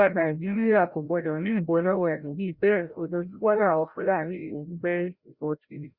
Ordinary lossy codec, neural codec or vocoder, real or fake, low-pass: Opus, 64 kbps; codec, 16 kHz, 0.5 kbps, FreqCodec, larger model; fake; 5.4 kHz